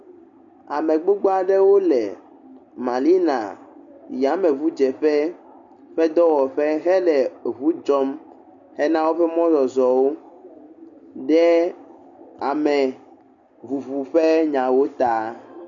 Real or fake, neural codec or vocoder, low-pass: real; none; 7.2 kHz